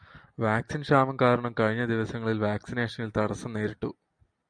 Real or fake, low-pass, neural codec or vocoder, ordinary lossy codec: real; 9.9 kHz; none; MP3, 96 kbps